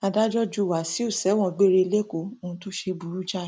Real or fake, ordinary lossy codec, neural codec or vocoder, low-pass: real; none; none; none